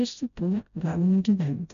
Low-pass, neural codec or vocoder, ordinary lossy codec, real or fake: 7.2 kHz; codec, 16 kHz, 0.5 kbps, FreqCodec, smaller model; MP3, 96 kbps; fake